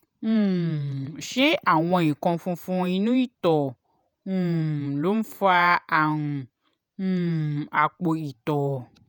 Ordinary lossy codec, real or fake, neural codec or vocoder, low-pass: none; fake; vocoder, 48 kHz, 128 mel bands, Vocos; none